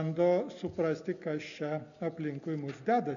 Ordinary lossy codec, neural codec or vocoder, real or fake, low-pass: MP3, 96 kbps; none; real; 7.2 kHz